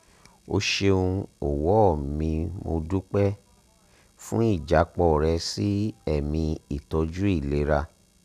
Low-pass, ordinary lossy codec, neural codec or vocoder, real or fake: 14.4 kHz; none; none; real